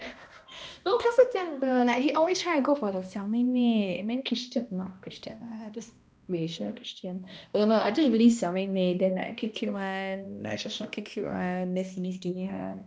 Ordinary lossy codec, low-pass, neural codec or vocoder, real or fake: none; none; codec, 16 kHz, 1 kbps, X-Codec, HuBERT features, trained on balanced general audio; fake